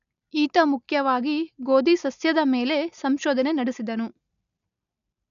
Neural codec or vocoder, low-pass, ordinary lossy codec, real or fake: none; 7.2 kHz; none; real